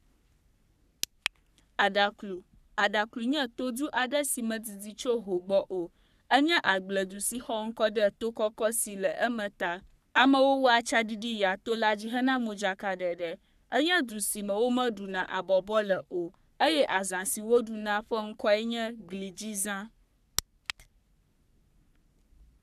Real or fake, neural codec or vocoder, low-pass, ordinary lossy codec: fake; codec, 44.1 kHz, 3.4 kbps, Pupu-Codec; 14.4 kHz; none